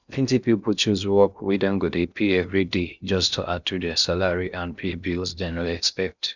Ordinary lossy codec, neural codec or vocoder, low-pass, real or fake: none; codec, 16 kHz in and 24 kHz out, 0.6 kbps, FocalCodec, streaming, 2048 codes; 7.2 kHz; fake